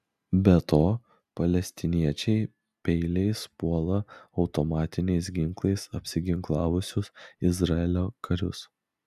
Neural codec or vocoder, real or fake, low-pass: none; real; 14.4 kHz